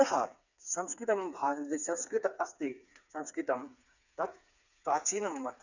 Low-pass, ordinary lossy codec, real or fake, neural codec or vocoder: 7.2 kHz; none; fake; codec, 16 kHz, 4 kbps, FreqCodec, smaller model